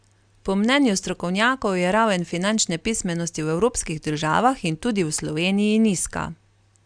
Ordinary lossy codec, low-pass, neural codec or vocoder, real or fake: Opus, 64 kbps; 9.9 kHz; none; real